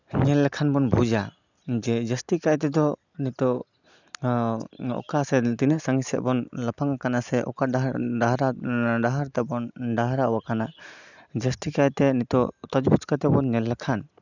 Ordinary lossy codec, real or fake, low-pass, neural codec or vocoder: none; real; 7.2 kHz; none